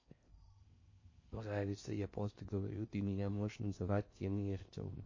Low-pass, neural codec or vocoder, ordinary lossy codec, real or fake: 7.2 kHz; codec, 16 kHz in and 24 kHz out, 0.6 kbps, FocalCodec, streaming, 4096 codes; MP3, 32 kbps; fake